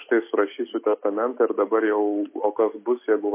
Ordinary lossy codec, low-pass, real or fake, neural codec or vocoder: MP3, 24 kbps; 3.6 kHz; real; none